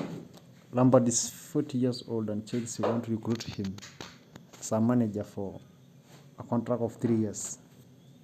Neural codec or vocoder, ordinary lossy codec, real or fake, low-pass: none; none; real; 14.4 kHz